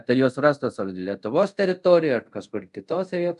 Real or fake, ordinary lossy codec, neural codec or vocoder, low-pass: fake; MP3, 96 kbps; codec, 24 kHz, 0.5 kbps, DualCodec; 10.8 kHz